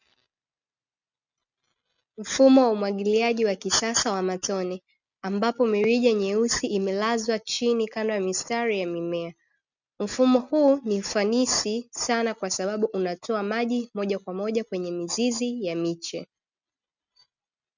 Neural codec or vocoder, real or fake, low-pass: none; real; 7.2 kHz